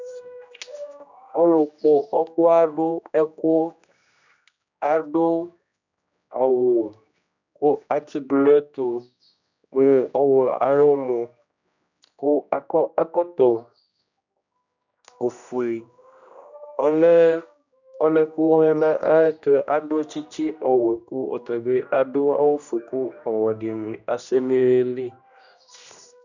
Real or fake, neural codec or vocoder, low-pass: fake; codec, 16 kHz, 1 kbps, X-Codec, HuBERT features, trained on general audio; 7.2 kHz